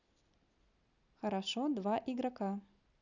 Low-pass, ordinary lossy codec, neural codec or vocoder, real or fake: 7.2 kHz; none; none; real